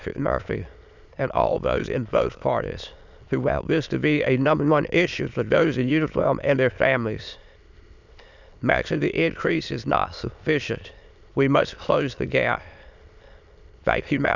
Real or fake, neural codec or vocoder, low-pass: fake; autoencoder, 22.05 kHz, a latent of 192 numbers a frame, VITS, trained on many speakers; 7.2 kHz